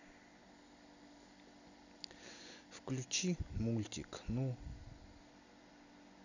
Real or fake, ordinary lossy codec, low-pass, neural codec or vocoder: real; none; 7.2 kHz; none